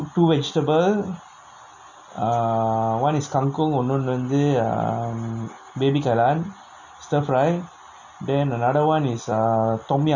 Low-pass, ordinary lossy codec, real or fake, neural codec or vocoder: 7.2 kHz; none; real; none